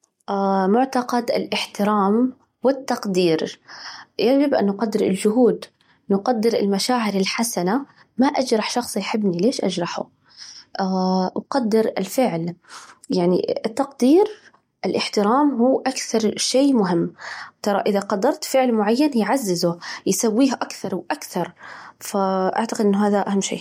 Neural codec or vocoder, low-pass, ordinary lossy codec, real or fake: none; 19.8 kHz; MP3, 64 kbps; real